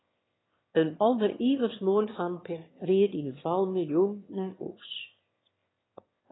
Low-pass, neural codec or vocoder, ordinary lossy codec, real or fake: 7.2 kHz; autoencoder, 22.05 kHz, a latent of 192 numbers a frame, VITS, trained on one speaker; AAC, 16 kbps; fake